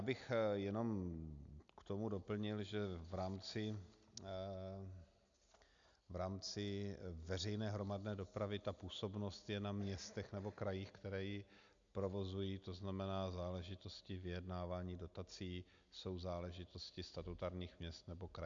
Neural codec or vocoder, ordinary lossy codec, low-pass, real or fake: none; AAC, 64 kbps; 7.2 kHz; real